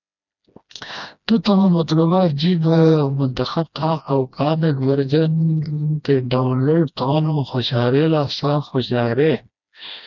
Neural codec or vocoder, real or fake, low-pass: codec, 16 kHz, 1 kbps, FreqCodec, smaller model; fake; 7.2 kHz